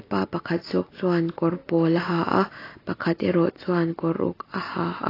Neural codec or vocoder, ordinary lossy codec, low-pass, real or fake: none; AAC, 24 kbps; 5.4 kHz; real